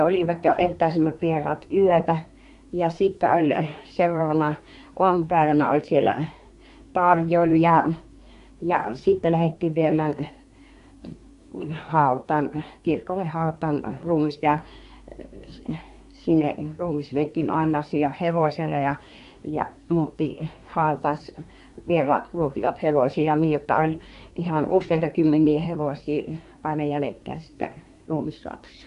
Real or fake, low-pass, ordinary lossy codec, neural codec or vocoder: fake; 10.8 kHz; MP3, 96 kbps; codec, 24 kHz, 1 kbps, SNAC